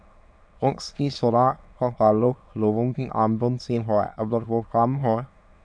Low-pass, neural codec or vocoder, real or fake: 9.9 kHz; autoencoder, 22.05 kHz, a latent of 192 numbers a frame, VITS, trained on many speakers; fake